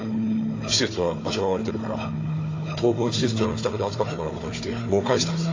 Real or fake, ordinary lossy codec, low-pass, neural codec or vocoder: fake; AAC, 32 kbps; 7.2 kHz; codec, 16 kHz, 4 kbps, FunCodec, trained on Chinese and English, 50 frames a second